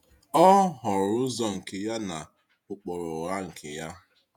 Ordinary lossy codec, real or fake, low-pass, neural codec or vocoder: none; fake; 19.8 kHz; vocoder, 48 kHz, 128 mel bands, Vocos